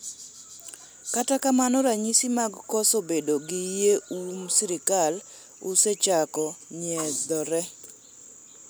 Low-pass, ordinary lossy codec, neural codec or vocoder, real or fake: none; none; none; real